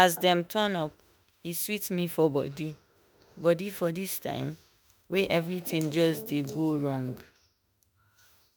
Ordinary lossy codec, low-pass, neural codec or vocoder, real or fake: none; none; autoencoder, 48 kHz, 32 numbers a frame, DAC-VAE, trained on Japanese speech; fake